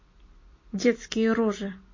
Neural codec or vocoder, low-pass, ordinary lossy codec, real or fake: none; 7.2 kHz; MP3, 32 kbps; real